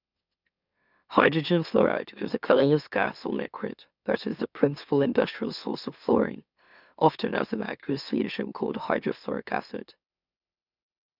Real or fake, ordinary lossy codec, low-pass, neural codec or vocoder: fake; none; 5.4 kHz; autoencoder, 44.1 kHz, a latent of 192 numbers a frame, MeloTTS